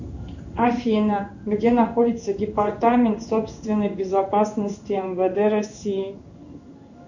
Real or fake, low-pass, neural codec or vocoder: fake; 7.2 kHz; codec, 16 kHz in and 24 kHz out, 1 kbps, XY-Tokenizer